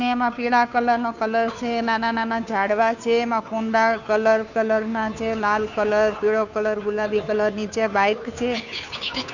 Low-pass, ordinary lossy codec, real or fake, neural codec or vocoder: 7.2 kHz; none; fake; codec, 16 kHz, 2 kbps, FunCodec, trained on Chinese and English, 25 frames a second